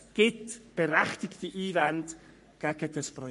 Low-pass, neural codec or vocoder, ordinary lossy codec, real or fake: 14.4 kHz; codec, 44.1 kHz, 3.4 kbps, Pupu-Codec; MP3, 48 kbps; fake